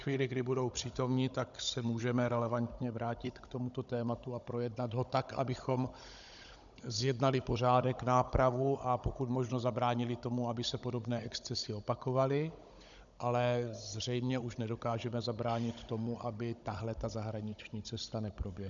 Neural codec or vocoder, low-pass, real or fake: codec, 16 kHz, 16 kbps, FunCodec, trained on Chinese and English, 50 frames a second; 7.2 kHz; fake